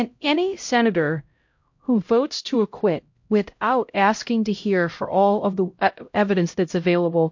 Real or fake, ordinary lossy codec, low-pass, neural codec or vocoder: fake; MP3, 48 kbps; 7.2 kHz; codec, 16 kHz, 0.5 kbps, X-Codec, HuBERT features, trained on LibriSpeech